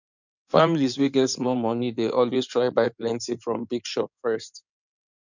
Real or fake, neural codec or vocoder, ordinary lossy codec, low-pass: fake; codec, 16 kHz in and 24 kHz out, 2.2 kbps, FireRedTTS-2 codec; none; 7.2 kHz